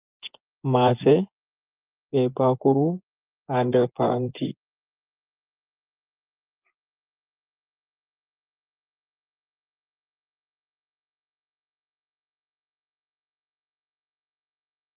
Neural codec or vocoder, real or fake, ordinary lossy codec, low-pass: vocoder, 24 kHz, 100 mel bands, Vocos; fake; Opus, 24 kbps; 3.6 kHz